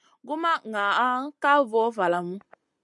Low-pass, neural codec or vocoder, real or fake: 10.8 kHz; none; real